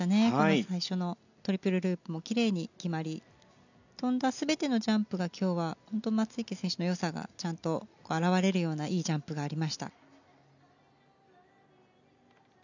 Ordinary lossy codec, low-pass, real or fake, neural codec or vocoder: none; 7.2 kHz; real; none